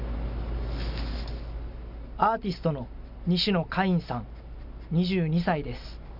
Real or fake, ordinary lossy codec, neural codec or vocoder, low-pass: real; none; none; 5.4 kHz